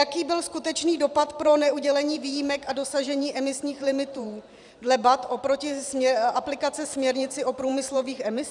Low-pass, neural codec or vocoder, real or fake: 10.8 kHz; vocoder, 44.1 kHz, 128 mel bands every 512 samples, BigVGAN v2; fake